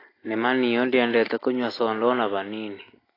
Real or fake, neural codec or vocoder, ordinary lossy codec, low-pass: real; none; AAC, 24 kbps; 5.4 kHz